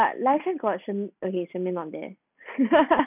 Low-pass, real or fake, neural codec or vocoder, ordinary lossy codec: 3.6 kHz; real; none; none